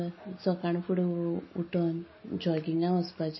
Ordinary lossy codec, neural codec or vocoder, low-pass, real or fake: MP3, 24 kbps; none; 7.2 kHz; real